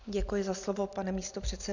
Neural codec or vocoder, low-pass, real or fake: none; 7.2 kHz; real